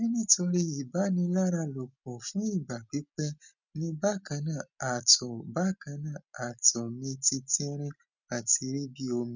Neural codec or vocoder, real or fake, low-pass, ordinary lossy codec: none; real; 7.2 kHz; none